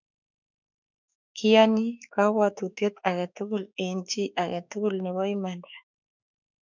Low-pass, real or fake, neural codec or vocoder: 7.2 kHz; fake; autoencoder, 48 kHz, 32 numbers a frame, DAC-VAE, trained on Japanese speech